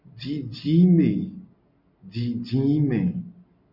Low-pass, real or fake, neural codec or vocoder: 5.4 kHz; real; none